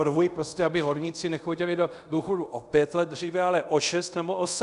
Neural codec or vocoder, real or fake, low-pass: codec, 24 kHz, 0.5 kbps, DualCodec; fake; 10.8 kHz